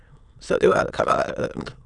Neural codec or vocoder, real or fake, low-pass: autoencoder, 22.05 kHz, a latent of 192 numbers a frame, VITS, trained on many speakers; fake; 9.9 kHz